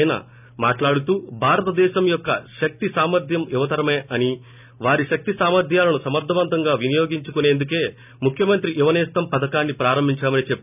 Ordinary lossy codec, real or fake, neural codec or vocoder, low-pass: none; real; none; 3.6 kHz